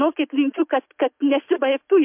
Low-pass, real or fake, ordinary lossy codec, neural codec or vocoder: 3.6 kHz; real; MP3, 32 kbps; none